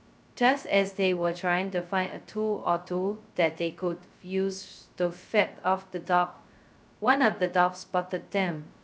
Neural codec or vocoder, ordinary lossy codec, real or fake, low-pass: codec, 16 kHz, 0.2 kbps, FocalCodec; none; fake; none